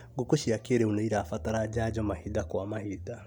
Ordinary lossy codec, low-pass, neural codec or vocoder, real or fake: MP3, 96 kbps; 19.8 kHz; none; real